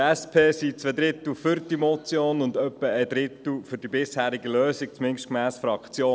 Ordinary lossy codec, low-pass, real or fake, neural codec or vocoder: none; none; real; none